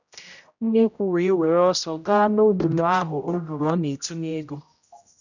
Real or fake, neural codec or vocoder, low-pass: fake; codec, 16 kHz, 0.5 kbps, X-Codec, HuBERT features, trained on general audio; 7.2 kHz